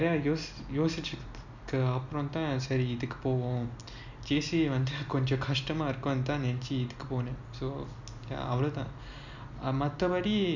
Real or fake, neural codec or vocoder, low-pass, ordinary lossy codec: real; none; 7.2 kHz; none